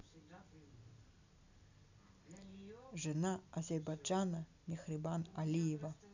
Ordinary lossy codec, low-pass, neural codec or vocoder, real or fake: none; 7.2 kHz; none; real